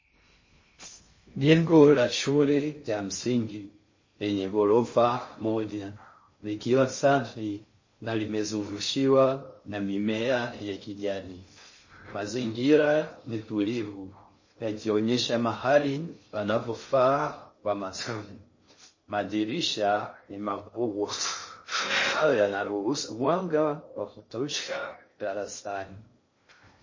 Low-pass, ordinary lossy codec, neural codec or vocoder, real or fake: 7.2 kHz; MP3, 32 kbps; codec, 16 kHz in and 24 kHz out, 0.6 kbps, FocalCodec, streaming, 2048 codes; fake